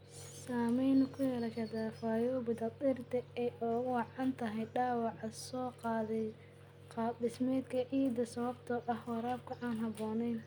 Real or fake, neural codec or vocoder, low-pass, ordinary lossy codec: real; none; none; none